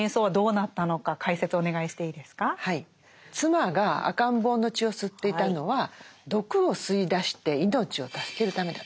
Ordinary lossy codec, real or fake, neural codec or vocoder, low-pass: none; real; none; none